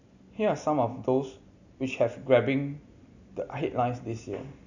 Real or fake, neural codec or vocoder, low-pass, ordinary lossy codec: real; none; 7.2 kHz; none